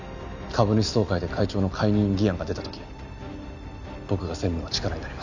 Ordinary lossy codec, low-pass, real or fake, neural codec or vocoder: none; 7.2 kHz; real; none